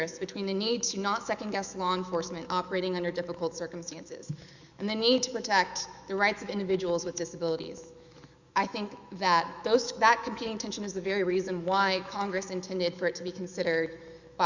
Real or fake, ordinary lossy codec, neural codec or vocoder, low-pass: fake; Opus, 64 kbps; autoencoder, 48 kHz, 128 numbers a frame, DAC-VAE, trained on Japanese speech; 7.2 kHz